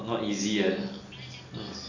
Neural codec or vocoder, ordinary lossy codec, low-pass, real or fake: none; none; 7.2 kHz; real